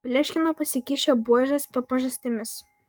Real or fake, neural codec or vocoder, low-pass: fake; codec, 44.1 kHz, 7.8 kbps, DAC; 19.8 kHz